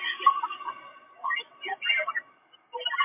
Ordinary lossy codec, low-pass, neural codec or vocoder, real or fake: MP3, 24 kbps; 3.6 kHz; none; real